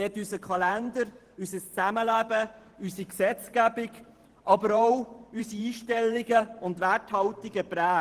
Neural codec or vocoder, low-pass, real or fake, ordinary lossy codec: vocoder, 44.1 kHz, 128 mel bands every 512 samples, BigVGAN v2; 14.4 kHz; fake; Opus, 16 kbps